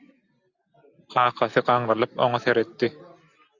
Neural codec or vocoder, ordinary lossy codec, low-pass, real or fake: none; Opus, 64 kbps; 7.2 kHz; real